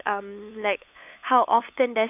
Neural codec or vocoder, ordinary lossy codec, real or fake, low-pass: codec, 16 kHz, 8 kbps, FunCodec, trained on LibriTTS, 25 frames a second; none; fake; 3.6 kHz